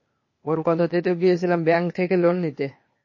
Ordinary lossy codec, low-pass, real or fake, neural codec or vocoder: MP3, 32 kbps; 7.2 kHz; fake; codec, 16 kHz, 0.8 kbps, ZipCodec